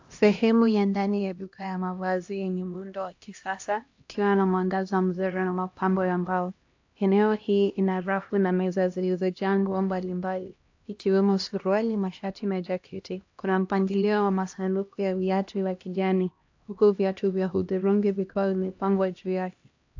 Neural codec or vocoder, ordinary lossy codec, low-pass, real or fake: codec, 16 kHz, 1 kbps, X-Codec, HuBERT features, trained on LibriSpeech; AAC, 48 kbps; 7.2 kHz; fake